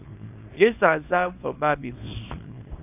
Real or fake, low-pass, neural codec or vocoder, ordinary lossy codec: fake; 3.6 kHz; codec, 24 kHz, 0.9 kbps, WavTokenizer, small release; AAC, 24 kbps